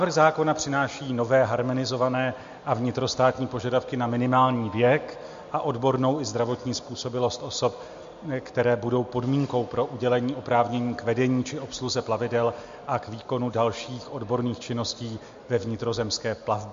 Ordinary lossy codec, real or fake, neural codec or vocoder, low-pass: MP3, 48 kbps; real; none; 7.2 kHz